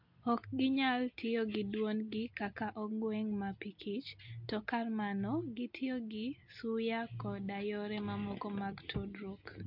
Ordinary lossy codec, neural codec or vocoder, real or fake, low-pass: MP3, 48 kbps; none; real; 5.4 kHz